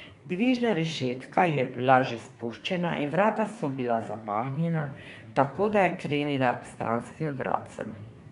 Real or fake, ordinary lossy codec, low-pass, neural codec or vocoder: fake; none; 10.8 kHz; codec, 24 kHz, 1 kbps, SNAC